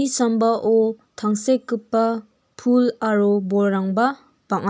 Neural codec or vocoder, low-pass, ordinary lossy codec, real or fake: none; none; none; real